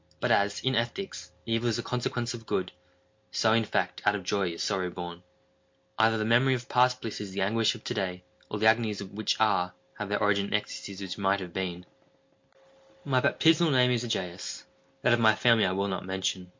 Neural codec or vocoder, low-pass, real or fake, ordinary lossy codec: none; 7.2 kHz; real; MP3, 48 kbps